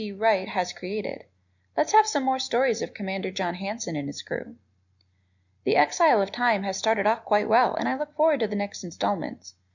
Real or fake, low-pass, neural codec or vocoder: real; 7.2 kHz; none